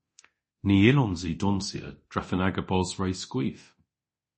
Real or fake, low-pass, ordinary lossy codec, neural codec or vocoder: fake; 10.8 kHz; MP3, 32 kbps; codec, 24 kHz, 0.9 kbps, DualCodec